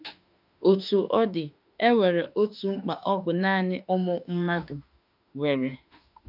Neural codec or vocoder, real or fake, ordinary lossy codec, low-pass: autoencoder, 48 kHz, 32 numbers a frame, DAC-VAE, trained on Japanese speech; fake; none; 5.4 kHz